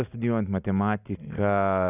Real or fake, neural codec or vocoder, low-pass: real; none; 3.6 kHz